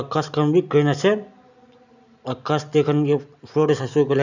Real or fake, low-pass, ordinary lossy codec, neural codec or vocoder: real; 7.2 kHz; none; none